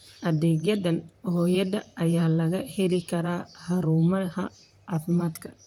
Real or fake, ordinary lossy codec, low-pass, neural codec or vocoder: fake; none; 19.8 kHz; vocoder, 44.1 kHz, 128 mel bands, Pupu-Vocoder